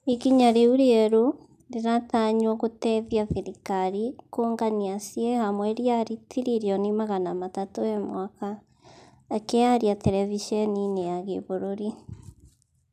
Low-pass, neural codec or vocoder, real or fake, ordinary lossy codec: 14.4 kHz; none; real; none